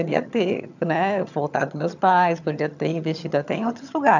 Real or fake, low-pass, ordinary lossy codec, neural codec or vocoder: fake; 7.2 kHz; none; vocoder, 22.05 kHz, 80 mel bands, HiFi-GAN